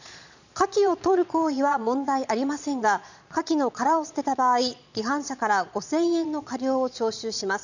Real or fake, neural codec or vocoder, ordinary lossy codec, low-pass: fake; vocoder, 22.05 kHz, 80 mel bands, Vocos; none; 7.2 kHz